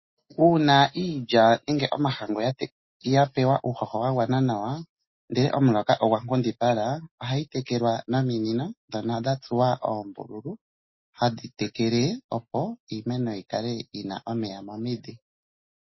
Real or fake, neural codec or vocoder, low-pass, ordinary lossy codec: real; none; 7.2 kHz; MP3, 24 kbps